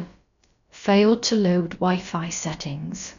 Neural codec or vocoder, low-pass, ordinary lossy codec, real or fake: codec, 16 kHz, about 1 kbps, DyCAST, with the encoder's durations; 7.2 kHz; none; fake